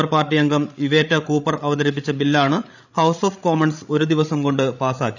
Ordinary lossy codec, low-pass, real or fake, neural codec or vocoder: none; 7.2 kHz; fake; codec, 16 kHz, 16 kbps, FreqCodec, larger model